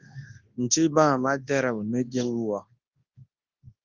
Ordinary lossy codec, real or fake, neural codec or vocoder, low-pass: Opus, 32 kbps; fake; codec, 24 kHz, 0.9 kbps, WavTokenizer, large speech release; 7.2 kHz